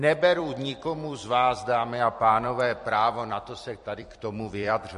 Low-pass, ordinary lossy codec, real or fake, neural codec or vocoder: 14.4 kHz; MP3, 48 kbps; fake; vocoder, 44.1 kHz, 128 mel bands every 256 samples, BigVGAN v2